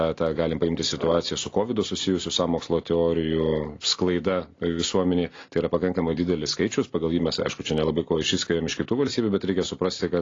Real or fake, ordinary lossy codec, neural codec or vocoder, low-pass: real; AAC, 32 kbps; none; 7.2 kHz